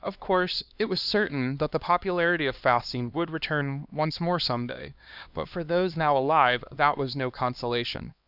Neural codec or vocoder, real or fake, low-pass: codec, 16 kHz, 2 kbps, X-Codec, HuBERT features, trained on LibriSpeech; fake; 5.4 kHz